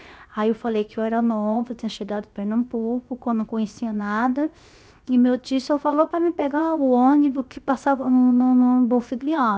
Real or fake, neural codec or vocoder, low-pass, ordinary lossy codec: fake; codec, 16 kHz, 0.7 kbps, FocalCodec; none; none